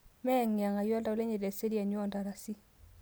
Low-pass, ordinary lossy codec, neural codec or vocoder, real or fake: none; none; none; real